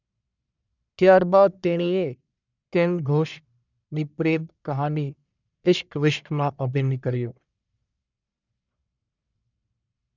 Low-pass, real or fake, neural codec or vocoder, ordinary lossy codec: 7.2 kHz; fake; codec, 44.1 kHz, 1.7 kbps, Pupu-Codec; none